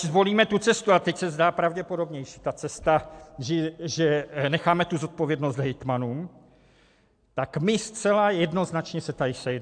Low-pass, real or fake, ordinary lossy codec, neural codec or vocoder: 9.9 kHz; real; AAC, 64 kbps; none